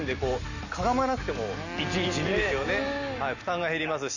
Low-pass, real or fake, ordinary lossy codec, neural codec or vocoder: 7.2 kHz; real; none; none